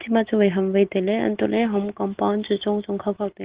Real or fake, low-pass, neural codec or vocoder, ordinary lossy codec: real; 3.6 kHz; none; Opus, 16 kbps